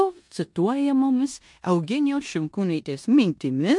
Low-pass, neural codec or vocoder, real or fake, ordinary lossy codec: 10.8 kHz; codec, 16 kHz in and 24 kHz out, 0.9 kbps, LongCat-Audio-Codec, four codebook decoder; fake; MP3, 64 kbps